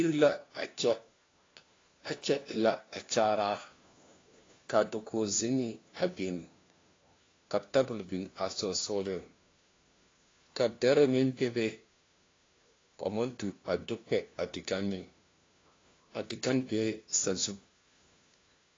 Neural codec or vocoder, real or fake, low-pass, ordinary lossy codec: codec, 16 kHz, 1 kbps, FunCodec, trained on LibriTTS, 50 frames a second; fake; 7.2 kHz; AAC, 32 kbps